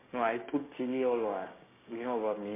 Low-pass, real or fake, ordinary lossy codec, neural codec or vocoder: 3.6 kHz; fake; MP3, 24 kbps; codec, 16 kHz, 6 kbps, DAC